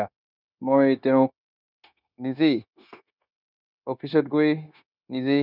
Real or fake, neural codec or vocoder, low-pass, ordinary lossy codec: fake; codec, 16 kHz, 0.9 kbps, LongCat-Audio-Codec; 5.4 kHz; none